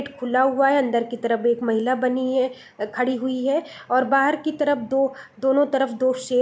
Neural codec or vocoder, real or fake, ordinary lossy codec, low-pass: none; real; none; none